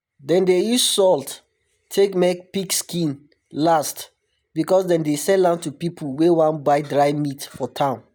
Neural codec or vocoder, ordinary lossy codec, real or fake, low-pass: none; none; real; none